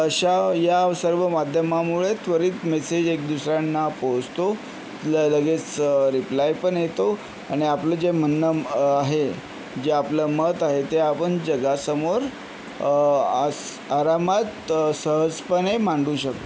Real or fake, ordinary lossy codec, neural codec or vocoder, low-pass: real; none; none; none